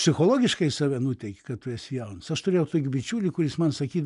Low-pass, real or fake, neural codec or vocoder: 10.8 kHz; real; none